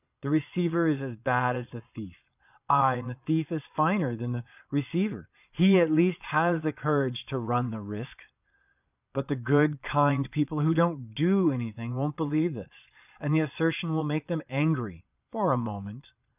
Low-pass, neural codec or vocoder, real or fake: 3.6 kHz; vocoder, 22.05 kHz, 80 mel bands, Vocos; fake